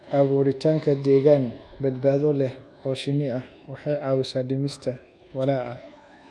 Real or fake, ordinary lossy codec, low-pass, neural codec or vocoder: fake; none; none; codec, 24 kHz, 1.2 kbps, DualCodec